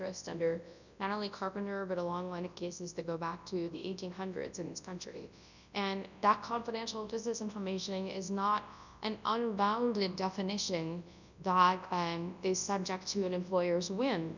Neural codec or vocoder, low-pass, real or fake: codec, 24 kHz, 0.9 kbps, WavTokenizer, large speech release; 7.2 kHz; fake